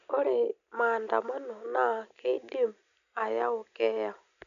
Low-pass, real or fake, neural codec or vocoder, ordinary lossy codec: 7.2 kHz; real; none; none